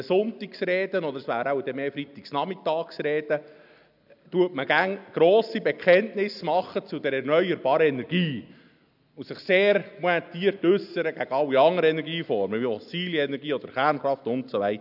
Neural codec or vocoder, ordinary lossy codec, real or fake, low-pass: none; none; real; 5.4 kHz